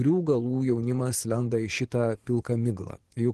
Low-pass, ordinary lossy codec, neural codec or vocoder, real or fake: 10.8 kHz; Opus, 16 kbps; vocoder, 24 kHz, 100 mel bands, Vocos; fake